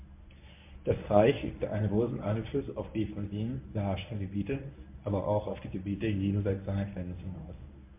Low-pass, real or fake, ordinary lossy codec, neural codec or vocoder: 3.6 kHz; fake; MP3, 32 kbps; codec, 24 kHz, 0.9 kbps, WavTokenizer, medium speech release version 1